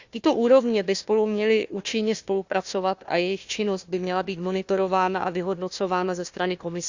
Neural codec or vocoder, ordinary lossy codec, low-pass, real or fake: codec, 16 kHz, 1 kbps, FunCodec, trained on Chinese and English, 50 frames a second; Opus, 64 kbps; 7.2 kHz; fake